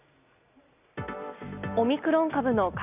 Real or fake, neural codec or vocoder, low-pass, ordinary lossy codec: real; none; 3.6 kHz; none